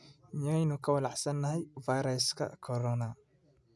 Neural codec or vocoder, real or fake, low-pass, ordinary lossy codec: none; real; none; none